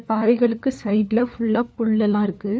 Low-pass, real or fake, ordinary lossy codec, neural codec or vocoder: none; fake; none; codec, 16 kHz, 4 kbps, FunCodec, trained on LibriTTS, 50 frames a second